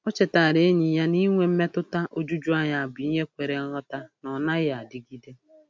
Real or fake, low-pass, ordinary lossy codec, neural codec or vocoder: real; none; none; none